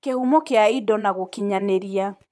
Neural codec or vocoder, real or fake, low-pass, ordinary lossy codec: vocoder, 22.05 kHz, 80 mel bands, Vocos; fake; none; none